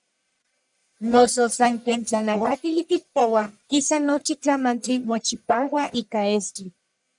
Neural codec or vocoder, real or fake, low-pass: codec, 44.1 kHz, 1.7 kbps, Pupu-Codec; fake; 10.8 kHz